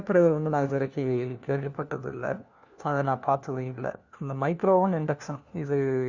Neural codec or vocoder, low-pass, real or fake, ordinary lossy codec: codec, 16 kHz, 1 kbps, FunCodec, trained on LibriTTS, 50 frames a second; 7.2 kHz; fake; Opus, 64 kbps